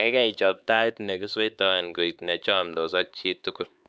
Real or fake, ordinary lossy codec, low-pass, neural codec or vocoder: fake; none; none; codec, 16 kHz, 4 kbps, X-Codec, HuBERT features, trained on LibriSpeech